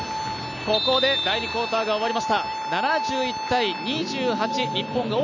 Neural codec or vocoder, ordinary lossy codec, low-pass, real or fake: none; none; 7.2 kHz; real